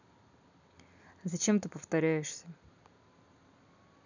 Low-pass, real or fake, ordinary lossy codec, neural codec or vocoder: 7.2 kHz; real; none; none